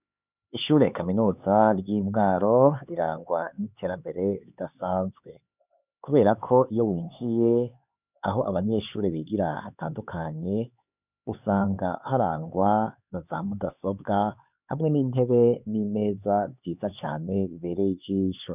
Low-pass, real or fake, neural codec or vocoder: 3.6 kHz; fake; codec, 16 kHz, 4 kbps, X-Codec, HuBERT features, trained on LibriSpeech